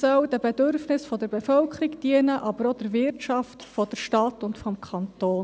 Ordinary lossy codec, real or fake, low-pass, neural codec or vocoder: none; real; none; none